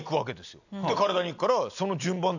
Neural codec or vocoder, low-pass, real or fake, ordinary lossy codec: none; 7.2 kHz; real; none